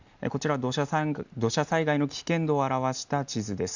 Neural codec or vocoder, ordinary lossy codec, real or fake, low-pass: none; none; real; 7.2 kHz